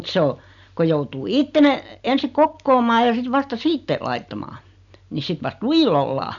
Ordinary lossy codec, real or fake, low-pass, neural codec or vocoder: none; real; 7.2 kHz; none